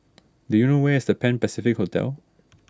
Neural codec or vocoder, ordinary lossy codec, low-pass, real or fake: none; none; none; real